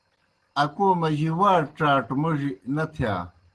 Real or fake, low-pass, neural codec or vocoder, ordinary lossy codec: real; 10.8 kHz; none; Opus, 16 kbps